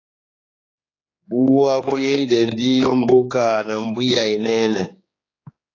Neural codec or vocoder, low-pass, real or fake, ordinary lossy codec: codec, 16 kHz, 2 kbps, X-Codec, HuBERT features, trained on general audio; 7.2 kHz; fake; AAC, 32 kbps